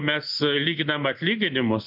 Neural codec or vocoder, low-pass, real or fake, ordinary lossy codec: none; 5.4 kHz; real; MP3, 48 kbps